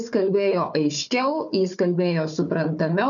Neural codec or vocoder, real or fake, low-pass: codec, 16 kHz, 4 kbps, FunCodec, trained on Chinese and English, 50 frames a second; fake; 7.2 kHz